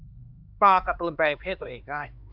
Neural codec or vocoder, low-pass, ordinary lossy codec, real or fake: codec, 16 kHz, 4 kbps, X-Codec, HuBERT features, trained on LibriSpeech; 5.4 kHz; AAC, 48 kbps; fake